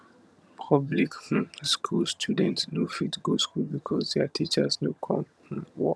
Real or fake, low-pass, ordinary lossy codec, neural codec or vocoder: fake; none; none; vocoder, 22.05 kHz, 80 mel bands, HiFi-GAN